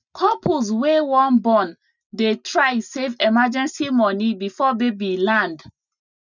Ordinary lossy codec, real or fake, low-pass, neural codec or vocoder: none; real; 7.2 kHz; none